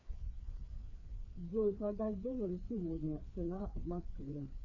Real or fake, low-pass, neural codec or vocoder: fake; 7.2 kHz; codec, 16 kHz, 4 kbps, FreqCodec, larger model